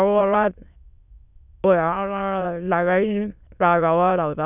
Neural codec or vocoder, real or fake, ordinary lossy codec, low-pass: autoencoder, 22.05 kHz, a latent of 192 numbers a frame, VITS, trained on many speakers; fake; none; 3.6 kHz